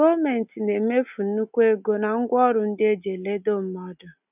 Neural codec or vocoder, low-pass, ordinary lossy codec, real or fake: none; 3.6 kHz; none; real